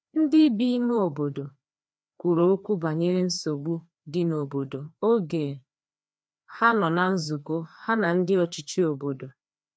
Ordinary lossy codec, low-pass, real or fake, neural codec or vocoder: none; none; fake; codec, 16 kHz, 2 kbps, FreqCodec, larger model